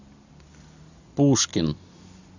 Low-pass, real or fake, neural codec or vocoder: 7.2 kHz; real; none